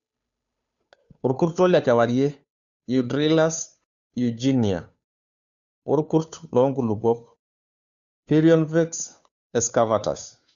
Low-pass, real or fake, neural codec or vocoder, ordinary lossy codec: 7.2 kHz; fake; codec, 16 kHz, 2 kbps, FunCodec, trained on Chinese and English, 25 frames a second; none